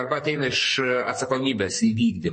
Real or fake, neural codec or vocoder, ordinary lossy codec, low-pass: fake; codec, 44.1 kHz, 2.6 kbps, SNAC; MP3, 32 kbps; 9.9 kHz